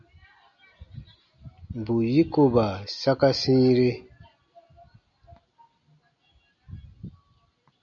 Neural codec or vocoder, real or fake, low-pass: none; real; 7.2 kHz